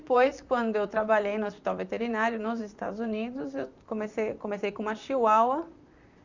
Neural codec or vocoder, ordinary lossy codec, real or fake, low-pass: vocoder, 44.1 kHz, 128 mel bands, Pupu-Vocoder; Opus, 64 kbps; fake; 7.2 kHz